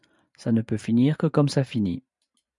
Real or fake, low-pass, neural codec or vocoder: fake; 10.8 kHz; vocoder, 44.1 kHz, 128 mel bands every 512 samples, BigVGAN v2